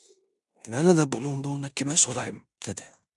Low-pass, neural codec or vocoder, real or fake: 10.8 kHz; codec, 16 kHz in and 24 kHz out, 0.9 kbps, LongCat-Audio-Codec, fine tuned four codebook decoder; fake